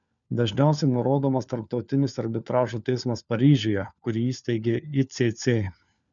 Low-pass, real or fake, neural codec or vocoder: 7.2 kHz; fake; codec, 16 kHz, 4 kbps, FunCodec, trained on LibriTTS, 50 frames a second